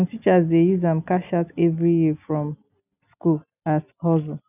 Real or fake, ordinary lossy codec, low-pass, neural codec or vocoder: real; none; 3.6 kHz; none